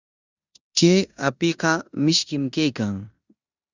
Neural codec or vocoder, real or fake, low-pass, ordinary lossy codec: codec, 16 kHz in and 24 kHz out, 0.9 kbps, LongCat-Audio-Codec, four codebook decoder; fake; 7.2 kHz; Opus, 64 kbps